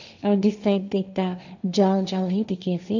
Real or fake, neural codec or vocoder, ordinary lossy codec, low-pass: fake; codec, 16 kHz, 1.1 kbps, Voila-Tokenizer; none; 7.2 kHz